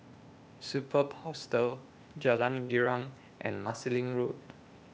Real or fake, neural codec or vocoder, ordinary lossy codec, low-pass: fake; codec, 16 kHz, 0.8 kbps, ZipCodec; none; none